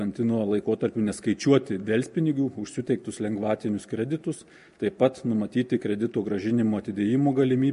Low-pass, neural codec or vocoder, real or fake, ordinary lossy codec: 10.8 kHz; none; real; MP3, 48 kbps